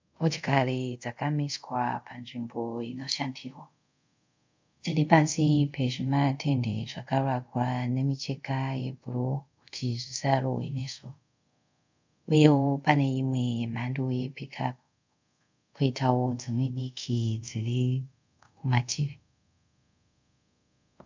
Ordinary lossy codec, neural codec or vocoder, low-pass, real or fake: MP3, 64 kbps; codec, 24 kHz, 0.5 kbps, DualCodec; 7.2 kHz; fake